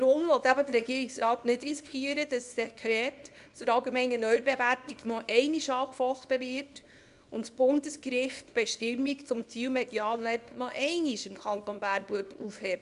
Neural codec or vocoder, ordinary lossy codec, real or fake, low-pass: codec, 24 kHz, 0.9 kbps, WavTokenizer, small release; none; fake; 10.8 kHz